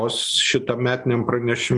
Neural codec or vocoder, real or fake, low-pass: none; real; 10.8 kHz